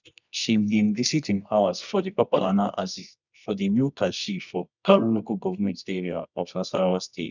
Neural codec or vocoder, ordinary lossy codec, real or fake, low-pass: codec, 24 kHz, 0.9 kbps, WavTokenizer, medium music audio release; none; fake; 7.2 kHz